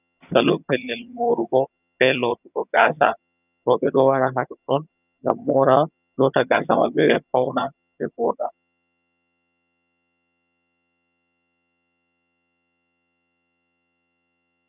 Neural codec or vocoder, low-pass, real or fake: vocoder, 22.05 kHz, 80 mel bands, HiFi-GAN; 3.6 kHz; fake